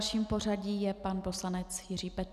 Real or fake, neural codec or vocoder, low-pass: real; none; 14.4 kHz